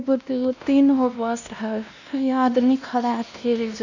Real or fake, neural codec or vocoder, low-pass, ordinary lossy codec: fake; codec, 16 kHz, 1 kbps, X-Codec, WavLM features, trained on Multilingual LibriSpeech; 7.2 kHz; none